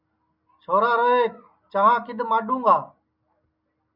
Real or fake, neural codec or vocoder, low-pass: real; none; 5.4 kHz